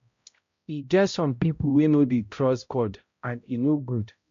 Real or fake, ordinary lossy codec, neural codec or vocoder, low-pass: fake; MP3, 48 kbps; codec, 16 kHz, 0.5 kbps, X-Codec, HuBERT features, trained on balanced general audio; 7.2 kHz